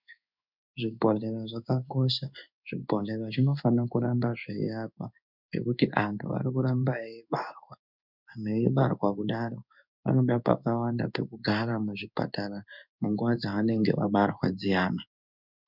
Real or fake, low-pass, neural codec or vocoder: fake; 5.4 kHz; codec, 16 kHz in and 24 kHz out, 1 kbps, XY-Tokenizer